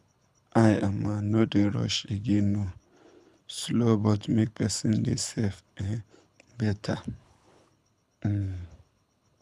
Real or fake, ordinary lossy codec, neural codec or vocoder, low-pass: fake; none; codec, 24 kHz, 6 kbps, HILCodec; none